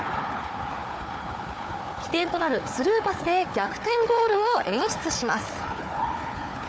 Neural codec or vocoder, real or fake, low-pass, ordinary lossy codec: codec, 16 kHz, 4 kbps, FunCodec, trained on Chinese and English, 50 frames a second; fake; none; none